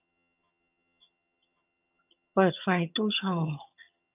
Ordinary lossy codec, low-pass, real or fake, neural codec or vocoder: AAC, 32 kbps; 3.6 kHz; fake; vocoder, 22.05 kHz, 80 mel bands, HiFi-GAN